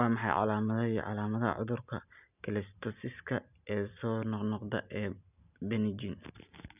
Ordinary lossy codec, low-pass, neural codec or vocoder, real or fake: none; 3.6 kHz; none; real